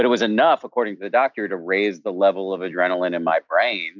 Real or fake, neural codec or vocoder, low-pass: real; none; 7.2 kHz